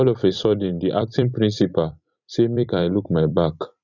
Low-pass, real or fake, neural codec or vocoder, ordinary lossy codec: 7.2 kHz; fake; vocoder, 44.1 kHz, 128 mel bands every 256 samples, BigVGAN v2; none